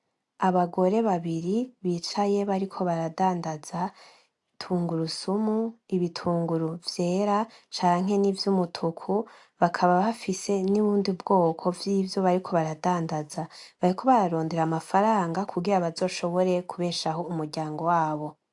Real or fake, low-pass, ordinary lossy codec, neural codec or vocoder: real; 10.8 kHz; AAC, 64 kbps; none